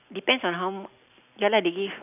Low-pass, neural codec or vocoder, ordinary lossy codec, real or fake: 3.6 kHz; none; none; real